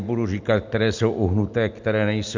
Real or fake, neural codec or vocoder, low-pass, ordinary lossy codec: real; none; 7.2 kHz; MP3, 64 kbps